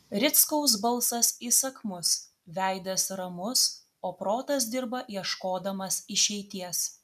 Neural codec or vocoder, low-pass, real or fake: none; 14.4 kHz; real